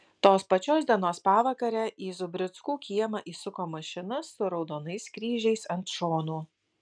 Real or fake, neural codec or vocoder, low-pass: real; none; 9.9 kHz